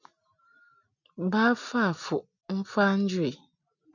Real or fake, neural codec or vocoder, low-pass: real; none; 7.2 kHz